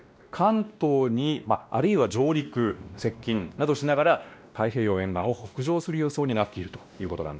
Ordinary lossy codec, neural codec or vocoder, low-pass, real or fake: none; codec, 16 kHz, 1 kbps, X-Codec, WavLM features, trained on Multilingual LibriSpeech; none; fake